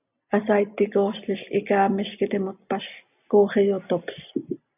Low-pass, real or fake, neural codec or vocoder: 3.6 kHz; real; none